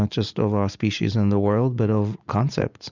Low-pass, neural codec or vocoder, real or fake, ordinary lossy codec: 7.2 kHz; vocoder, 44.1 kHz, 80 mel bands, Vocos; fake; Opus, 64 kbps